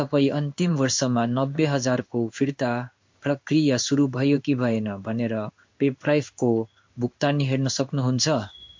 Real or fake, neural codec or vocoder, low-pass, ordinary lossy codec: fake; codec, 16 kHz in and 24 kHz out, 1 kbps, XY-Tokenizer; 7.2 kHz; MP3, 48 kbps